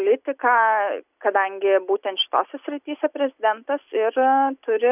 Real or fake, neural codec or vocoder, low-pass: real; none; 3.6 kHz